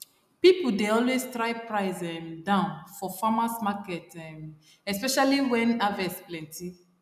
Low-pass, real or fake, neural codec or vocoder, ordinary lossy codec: 14.4 kHz; real; none; none